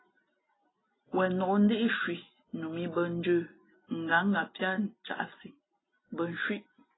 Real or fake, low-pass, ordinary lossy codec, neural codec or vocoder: real; 7.2 kHz; AAC, 16 kbps; none